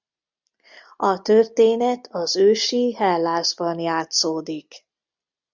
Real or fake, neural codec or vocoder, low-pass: real; none; 7.2 kHz